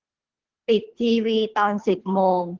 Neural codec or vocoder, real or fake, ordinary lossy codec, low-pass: codec, 24 kHz, 3 kbps, HILCodec; fake; Opus, 16 kbps; 7.2 kHz